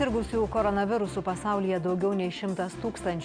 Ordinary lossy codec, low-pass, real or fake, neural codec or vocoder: Opus, 64 kbps; 9.9 kHz; real; none